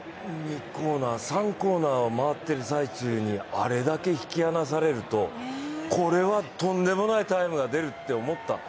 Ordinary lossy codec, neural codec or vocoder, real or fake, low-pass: none; none; real; none